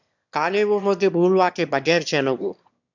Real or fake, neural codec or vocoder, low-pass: fake; autoencoder, 22.05 kHz, a latent of 192 numbers a frame, VITS, trained on one speaker; 7.2 kHz